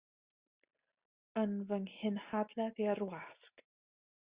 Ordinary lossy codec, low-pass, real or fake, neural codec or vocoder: Opus, 64 kbps; 3.6 kHz; real; none